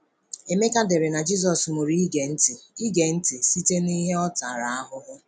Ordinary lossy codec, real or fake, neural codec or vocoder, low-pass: none; real; none; 9.9 kHz